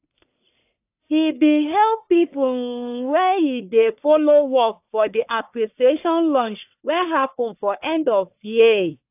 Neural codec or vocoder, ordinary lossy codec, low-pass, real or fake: codec, 44.1 kHz, 1.7 kbps, Pupu-Codec; AAC, 32 kbps; 3.6 kHz; fake